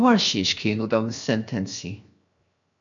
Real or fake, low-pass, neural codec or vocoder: fake; 7.2 kHz; codec, 16 kHz, 0.7 kbps, FocalCodec